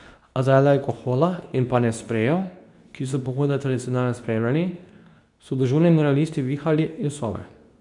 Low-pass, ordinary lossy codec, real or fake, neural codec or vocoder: 10.8 kHz; none; fake; codec, 24 kHz, 0.9 kbps, WavTokenizer, medium speech release version 2